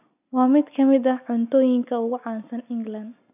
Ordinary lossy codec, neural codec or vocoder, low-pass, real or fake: none; none; 3.6 kHz; real